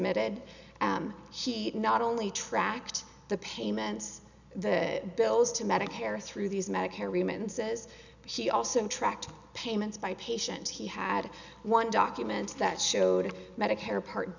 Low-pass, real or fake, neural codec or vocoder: 7.2 kHz; real; none